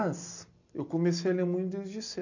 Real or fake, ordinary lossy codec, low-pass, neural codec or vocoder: real; none; 7.2 kHz; none